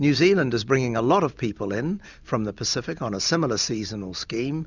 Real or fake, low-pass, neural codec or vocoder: real; 7.2 kHz; none